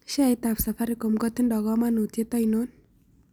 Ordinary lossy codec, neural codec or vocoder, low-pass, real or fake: none; none; none; real